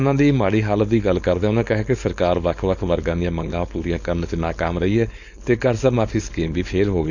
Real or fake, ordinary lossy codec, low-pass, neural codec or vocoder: fake; none; 7.2 kHz; codec, 16 kHz, 4.8 kbps, FACodec